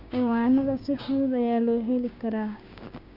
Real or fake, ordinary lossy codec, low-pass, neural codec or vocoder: fake; none; 5.4 kHz; codec, 16 kHz, 6 kbps, DAC